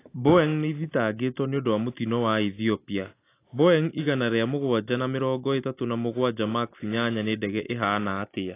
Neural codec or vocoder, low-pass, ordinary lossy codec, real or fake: none; 3.6 kHz; AAC, 24 kbps; real